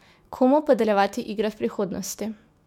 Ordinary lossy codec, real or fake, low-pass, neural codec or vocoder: MP3, 96 kbps; fake; 19.8 kHz; autoencoder, 48 kHz, 128 numbers a frame, DAC-VAE, trained on Japanese speech